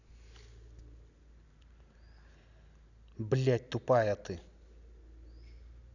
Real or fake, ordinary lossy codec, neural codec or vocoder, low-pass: real; none; none; 7.2 kHz